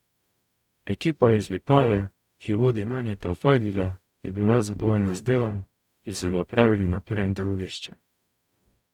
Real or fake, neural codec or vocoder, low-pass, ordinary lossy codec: fake; codec, 44.1 kHz, 0.9 kbps, DAC; 19.8 kHz; none